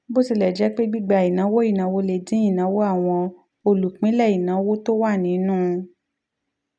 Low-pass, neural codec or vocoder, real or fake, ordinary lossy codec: 9.9 kHz; none; real; none